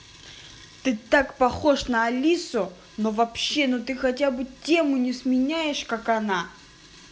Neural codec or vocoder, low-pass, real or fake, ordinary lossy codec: none; none; real; none